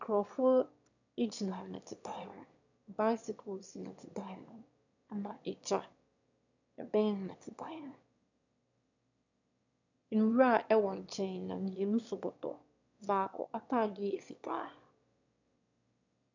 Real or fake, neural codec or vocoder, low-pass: fake; autoencoder, 22.05 kHz, a latent of 192 numbers a frame, VITS, trained on one speaker; 7.2 kHz